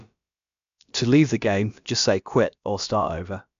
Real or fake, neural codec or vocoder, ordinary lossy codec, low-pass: fake; codec, 16 kHz, about 1 kbps, DyCAST, with the encoder's durations; MP3, 64 kbps; 7.2 kHz